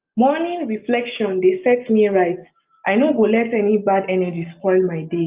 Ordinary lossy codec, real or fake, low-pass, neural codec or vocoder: Opus, 32 kbps; real; 3.6 kHz; none